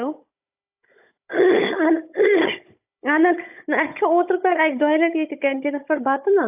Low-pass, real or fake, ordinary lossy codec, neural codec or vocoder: 3.6 kHz; fake; AAC, 32 kbps; codec, 16 kHz, 16 kbps, FunCodec, trained on Chinese and English, 50 frames a second